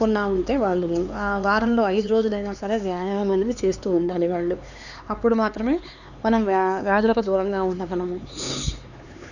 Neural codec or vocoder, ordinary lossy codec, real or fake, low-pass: codec, 16 kHz, 4 kbps, X-Codec, HuBERT features, trained on LibriSpeech; none; fake; 7.2 kHz